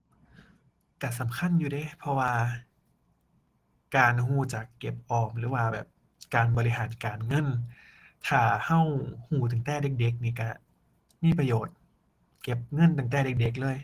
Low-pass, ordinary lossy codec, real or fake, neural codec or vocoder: 14.4 kHz; Opus, 16 kbps; real; none